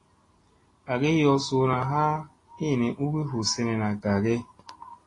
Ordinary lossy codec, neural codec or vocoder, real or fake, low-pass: AAC, 32 kbps; none; real; 10.8 kHz